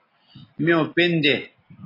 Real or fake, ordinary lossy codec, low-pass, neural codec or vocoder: real; AAC, 24 kbps; 5.4 kHz; none